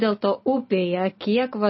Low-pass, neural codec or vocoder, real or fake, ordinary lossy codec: 7.2 kHz; none; real; MP3, 24 kbps